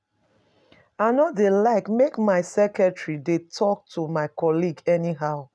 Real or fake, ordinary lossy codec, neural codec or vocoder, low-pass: real; none; none; none